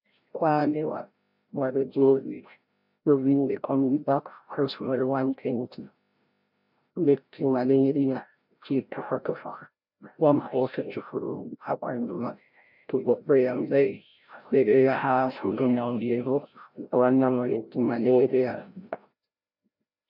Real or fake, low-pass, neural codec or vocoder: fake; 5.4 kHz; codec, 16 kHz, 0.5 kbps, FreqCodec, larger model